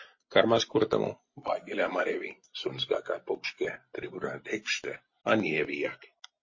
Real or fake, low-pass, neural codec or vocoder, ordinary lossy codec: real; 7.2 kHz; none; MP3, 32 kbps